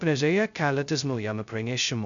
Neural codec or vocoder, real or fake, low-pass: codec, 16 kHz, 0.2 kbps, FocalCodec; fake; 7.2 kHz